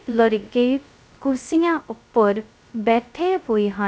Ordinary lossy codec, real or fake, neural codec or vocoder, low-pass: none; fake; codec, 16 kHz, 0.2 kbps, FocalCodec; none